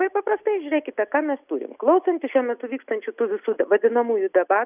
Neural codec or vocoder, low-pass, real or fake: none; 3.6 kHz; real